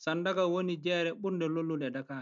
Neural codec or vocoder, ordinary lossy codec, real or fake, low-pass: none; none; real; 7.2 kHz